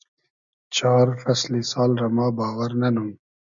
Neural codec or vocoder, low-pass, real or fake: none; 7.2 kHz; real